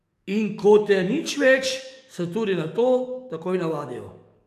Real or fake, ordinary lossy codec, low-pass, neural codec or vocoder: fake; none; 14.4 kHz; codec, 44.1 kHz, 7.8 kbps, DAC